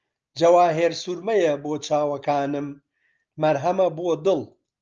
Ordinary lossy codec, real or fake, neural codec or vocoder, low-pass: Opus, 24 kbps; real; none; 7.2 kHz